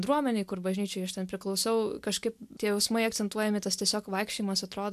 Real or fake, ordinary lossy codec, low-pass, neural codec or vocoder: real; AAC, 96 kbps; 14.4 kHz; none